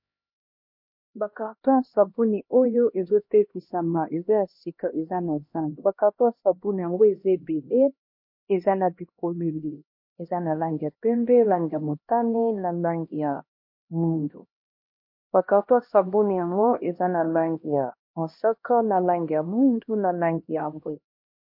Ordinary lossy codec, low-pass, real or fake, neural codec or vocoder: MP3, 32 kbps; 5.4 kHz; fake; codec, 16 kHz, 1 kbps, X-Codec, HuBERT features, trained on LibriSpeech